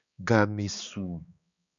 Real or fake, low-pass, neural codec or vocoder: fake; 7.2 kHz; codec, 16 kHz, 2 kbps, X-Codec, HuBERT features, trained on balanced general audio